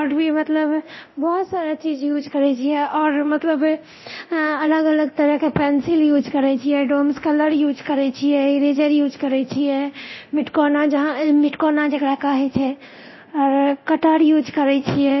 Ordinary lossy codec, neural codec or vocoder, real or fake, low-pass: MP3, 24 kbps; codec, 24 kHz, 0.9 kbps, DualCodec; fake; 7.2 kHz